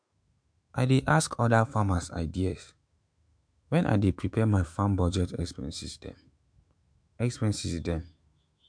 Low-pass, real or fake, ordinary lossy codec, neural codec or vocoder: 9.9 kHz; fake; MP3, 64 kbps; autoencoder, 48 kHz, 128 numbers a frame, DAC-VAE, trained on Japanese speech